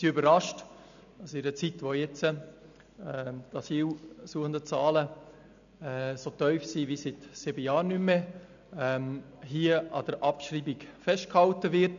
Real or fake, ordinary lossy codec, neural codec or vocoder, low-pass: real; none; none; 7.2 kHz